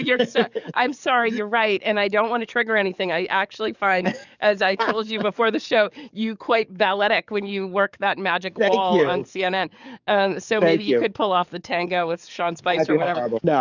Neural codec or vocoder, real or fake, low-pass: codec, 44.1 kHz, 7.8 kbps, DAC; fake; 7.2 kHz